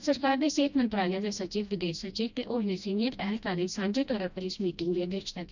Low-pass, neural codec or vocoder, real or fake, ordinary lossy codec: 7.2 kHz; codec, 16 kHz, 1 kbps, FreqCodec, smaller model; fake; none